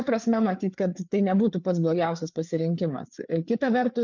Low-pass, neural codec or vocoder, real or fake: 7.2 kHz; codec, 16 kHz, 4 kbps, FreqCodec, larger model; fake